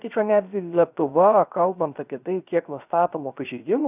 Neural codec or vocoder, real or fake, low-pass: codec, 16 kHz, 0.3 kbps, FocalCodec; fake; 3.6 kHz